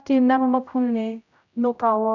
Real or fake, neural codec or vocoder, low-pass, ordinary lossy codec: fake; codec, 16 kHz, 0.5 kbps, X-Codec, HuBERT features, trained on general audio; 7.2 kHz; none